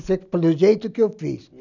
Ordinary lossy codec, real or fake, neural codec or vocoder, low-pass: none; real; none; 7.2 kHz